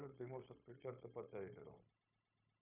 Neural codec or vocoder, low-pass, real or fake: codec, 16 kHz, 0.9 kbps, LongCat-Audio-Codec; 3.6 kHz; fake